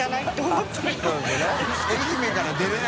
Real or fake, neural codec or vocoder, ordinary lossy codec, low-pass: real; none; none; none